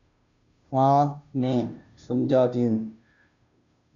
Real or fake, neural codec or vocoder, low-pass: fake; codec, 16 kHz, 0.5 kbps, FunCodec, trained on Chinese and English, 25 frames a second; 7.2 kHz